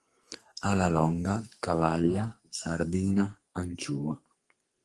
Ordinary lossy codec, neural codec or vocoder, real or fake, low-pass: Opus, 24 kbps; codec, 44.1 kHz, 2.6 kbps, SNAC; fake; 10.8 kHz